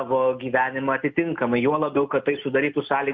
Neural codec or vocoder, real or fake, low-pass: none; real; 7.2 kHz